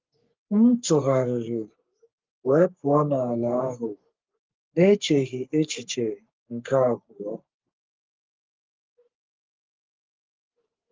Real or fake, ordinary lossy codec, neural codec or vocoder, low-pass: fake; Opus, 32 kbps; codec, 44.1 kHz, 3.4 kbps, Pupu-Codec; 7.2 kHz